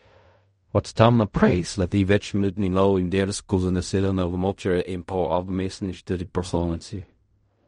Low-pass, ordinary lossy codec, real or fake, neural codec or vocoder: 10.8 kHz; MP3, 48 kbps; fake; codec, 16 kHz in and 24 kHz out, 0.4 kbps, LongCat-Audio-Codec, fine tuned four codebook decoder